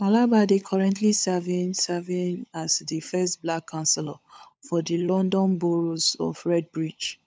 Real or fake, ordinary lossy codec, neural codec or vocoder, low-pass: fake; none; codec, 16 kHz, 16 kbps, FunCodec, trained on LibriTTS, 50 frames a second; none